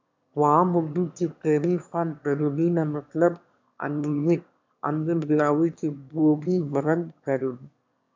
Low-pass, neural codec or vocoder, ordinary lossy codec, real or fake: 7.2 kHz; autoencoder, 22.05 kHz, a latent of 192 numbers a frame, VITS, trained on one speaker; MP3, 64 kbps; fake